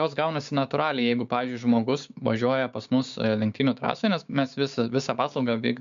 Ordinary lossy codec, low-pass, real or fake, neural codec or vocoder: MP3, 64 kbps; 7.2 kHz; fake; codec, 16 kHz, 6 kbps, DAC